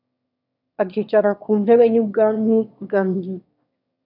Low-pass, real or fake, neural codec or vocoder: 5.4 kHz; fake; autoencoder, 22.05 kHz, a latent of 192 numbers a frame, VITS, trained on one speaker